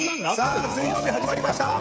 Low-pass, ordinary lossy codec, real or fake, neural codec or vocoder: none; none; fake; codec, 16 kHz, 16 kbps, FreqCodec, smaller model